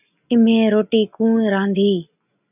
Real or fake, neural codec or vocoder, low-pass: real; none; 3.6 kHz